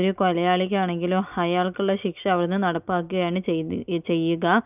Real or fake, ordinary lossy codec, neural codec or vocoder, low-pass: real; none; none; 3.6 kHz